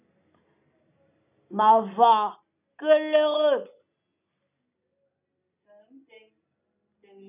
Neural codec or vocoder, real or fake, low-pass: none; real; 3.6 kHz